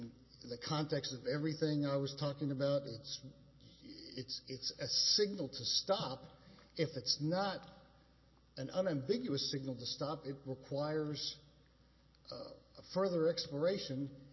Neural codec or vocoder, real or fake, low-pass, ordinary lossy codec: none; real; 7.2 kHz; MP3, 24 kbps